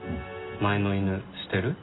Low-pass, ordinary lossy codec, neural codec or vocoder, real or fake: 7.2 kHz; AAC, 16 kbps; none; real